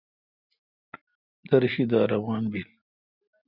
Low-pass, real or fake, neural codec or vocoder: 5.4 kHz; real; none